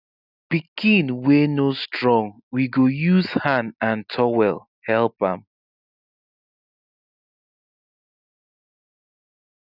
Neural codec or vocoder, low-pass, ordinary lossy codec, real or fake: none; 5.4 kHz; none; real